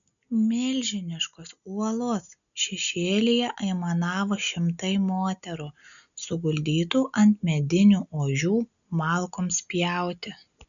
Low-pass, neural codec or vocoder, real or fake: 7.2 kHz; none; real